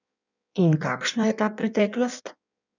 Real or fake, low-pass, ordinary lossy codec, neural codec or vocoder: fake; 7.2 kHz; none; codec, 16 kHz in and 24 kHz out, 1.1 kbps, FireRedTTS-2 codec